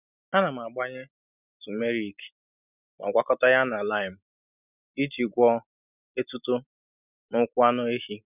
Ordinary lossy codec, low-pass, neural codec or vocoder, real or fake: none; 3.6 kHz; none; real